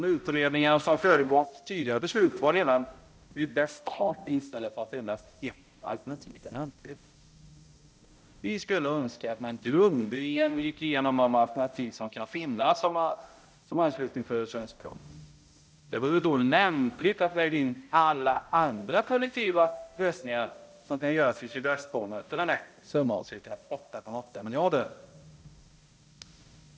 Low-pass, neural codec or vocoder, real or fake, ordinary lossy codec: none; codec, 16 kHz, 0.5 kbps, X-Codec, HuBERT features, trained on balanced general audio; fake; none